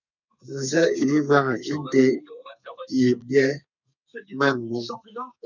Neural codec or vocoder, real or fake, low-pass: codec, 32 kHz, 1.9 kbps, SNAC; fake; 7.2 kHz